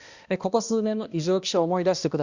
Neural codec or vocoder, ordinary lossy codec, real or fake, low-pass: codec, 16 kHz, 1 kbps, X-Codec, HuBERT features, trained on balanced general audio; Opus, 64 kbps; fake; 7.2 kHz